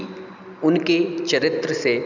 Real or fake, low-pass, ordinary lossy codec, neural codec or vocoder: real; 7.2 kHz; none; none